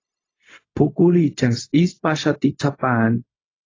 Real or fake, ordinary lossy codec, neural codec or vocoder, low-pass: fake; AAC, 32 kbps; codec, 16 kHz, 0.4 kbps, LongCat-Audio-Codec; 7.2 kHz